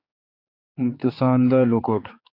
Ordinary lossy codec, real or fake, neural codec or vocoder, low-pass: AAC, 32 kbps; fake; codec, 16 kHz, 4 kbps, X-Codec, HuBERT features, trained on general audio; 5.4 kHz